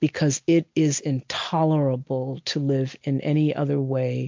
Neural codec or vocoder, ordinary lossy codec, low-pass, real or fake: none; MP3, 48 kbps; 7.2 kHz; real